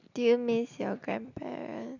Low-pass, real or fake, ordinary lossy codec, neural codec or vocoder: 7.2 kHz; real; none; none